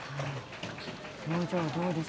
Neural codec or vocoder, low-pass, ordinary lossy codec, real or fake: none; none; none; real